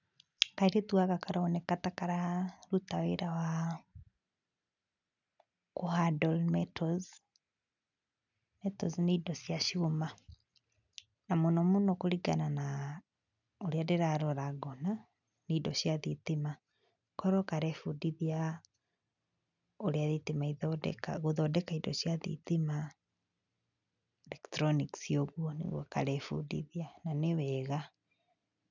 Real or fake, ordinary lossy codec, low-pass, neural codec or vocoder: real; none; 7.2 kHz; none